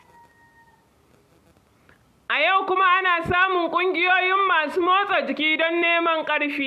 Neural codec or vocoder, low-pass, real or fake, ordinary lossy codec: none; 14.4 kHz; real; MP3, 96 kbps